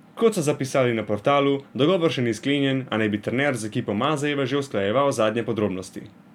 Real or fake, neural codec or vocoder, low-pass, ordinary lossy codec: real; none; 19.8 kHz; none